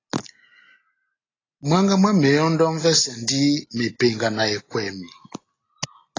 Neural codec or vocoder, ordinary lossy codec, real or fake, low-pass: none; AAC, 32 kbps; real; 7.2 kHz